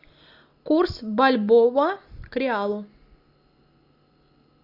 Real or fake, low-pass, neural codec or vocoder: real; 5.4 kHz; none